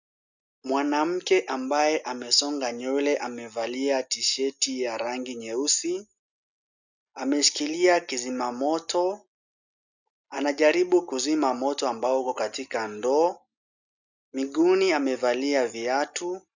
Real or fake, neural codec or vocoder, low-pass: real; none; 7.2 kHz